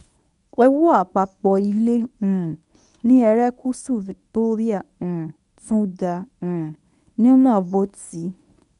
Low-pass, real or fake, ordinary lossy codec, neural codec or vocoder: 10.8 kHz; fake; none; codec, 24 kHz, 0.9 kbps, WavTokenizer, medium speech release version 1